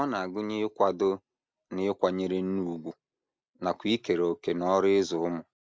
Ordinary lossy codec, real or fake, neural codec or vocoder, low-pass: none; real; none; none